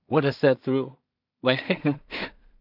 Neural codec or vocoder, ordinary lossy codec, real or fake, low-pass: codec, 16 kHz in and 24 kHz out, 0.4 kbps, LongCat-Audio-Codec, two codebook decoder; none; fake; 5.4 kHz